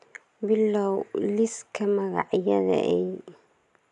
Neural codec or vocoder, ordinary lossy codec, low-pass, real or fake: none; none; 10.8 kHz; real